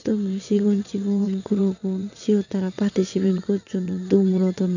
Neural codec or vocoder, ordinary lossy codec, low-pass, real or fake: vocoder, 22.05 kHz, 80 mel bands, WaveNeXt; none; 7.2 kHz; fake